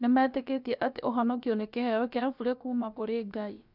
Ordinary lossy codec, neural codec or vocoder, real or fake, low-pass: none; codec, 16 kHz, about 1 kbps, DyCAST, with the encoder's durations; fake; 5.4 kHz